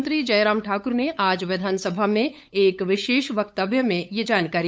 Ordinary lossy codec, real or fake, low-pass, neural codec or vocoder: none; fake; none; codec, 16 kHz, 8 kbps, FunCodec, trained on LibriTTS, 25 frames a second